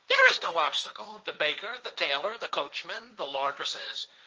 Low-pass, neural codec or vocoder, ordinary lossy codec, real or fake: 7.2 kHz; codec, 16 kHz, 1.1 kbps, Voila-Tokenizer; Opus, 24 kbps; fake